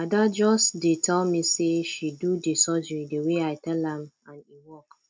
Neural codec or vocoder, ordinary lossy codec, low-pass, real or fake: none; none; none; real